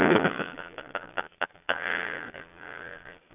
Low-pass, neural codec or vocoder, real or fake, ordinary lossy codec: 3.6 kHz; vocoder, 22.05 kHz, 80 mel bands, Vocos; fake; none